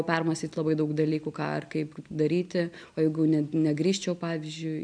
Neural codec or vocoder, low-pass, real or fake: none; 9.9 kHz; real